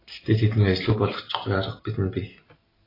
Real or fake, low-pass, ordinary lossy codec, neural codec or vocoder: real; 5.4 kHz; AAC, 24 kbps; none